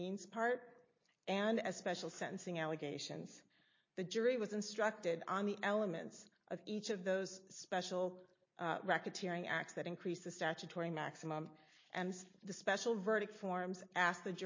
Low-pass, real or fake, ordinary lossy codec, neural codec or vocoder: 7.2 kHz; real; MP3, 32 kbps; none